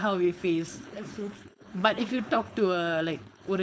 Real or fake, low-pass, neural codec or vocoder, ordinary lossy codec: fake; none; codec, 16 kHz, 4.8 kbps, FACodec; none